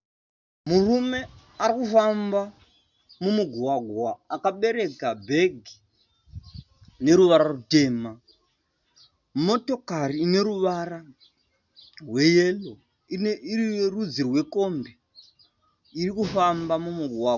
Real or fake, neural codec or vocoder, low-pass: real; none; 7.2 kHz